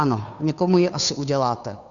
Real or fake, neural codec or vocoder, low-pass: fake; codec, 16 kHz, 2 kbps, FunCodec, trained on Chinese and English, 25 frames a second; 7.2 kHz